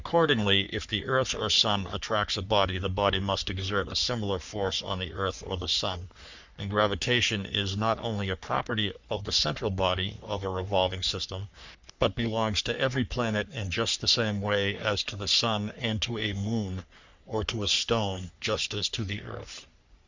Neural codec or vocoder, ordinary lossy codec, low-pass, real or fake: codec, 44.1 kHz, 3.4 kbps, Pupu-Codec; Opus, 64 kbps; 7.2 kHz; fake